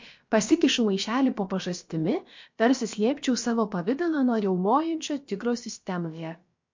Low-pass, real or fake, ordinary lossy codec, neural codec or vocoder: 7.2 kHz; fake; MP3, 48 kbps; codec, 16 kHz, about 1 kbps, DyCAST, with the encoder's durations